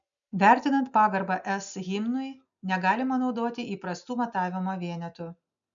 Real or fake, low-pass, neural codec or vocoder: real; 7.2 kHz; none